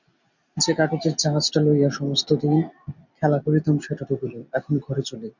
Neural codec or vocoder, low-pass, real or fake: none; 7.2 kHz; real